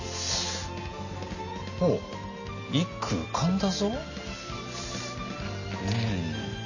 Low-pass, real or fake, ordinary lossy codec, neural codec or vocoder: 7.2 kHz; real; none; none